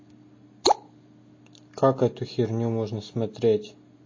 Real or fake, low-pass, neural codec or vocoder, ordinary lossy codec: real; 7.2 kHz; none; MP3, 32 kbps